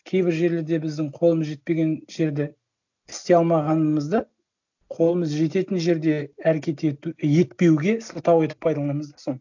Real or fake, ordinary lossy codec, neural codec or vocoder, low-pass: real; none; none; 7.2 kHz